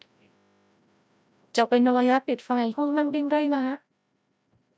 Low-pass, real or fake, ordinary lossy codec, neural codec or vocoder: none; fake; none; codec, 16 kHz, 0.5 kbps, FreqCodec, larger model